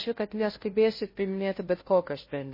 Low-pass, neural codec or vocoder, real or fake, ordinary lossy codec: 5.4 kHz; codec, 16 kHz, 0.5 kbps, FunCodec, trained on Chinese and English, 25 frames a second; fake; MP3, 24 kbps